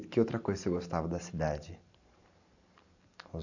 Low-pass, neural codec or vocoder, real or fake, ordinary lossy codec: 7.2 kHz; vocoder, 44.1 kHz, 128 mel bands every 512 samples, BigVGAN v2; fake; none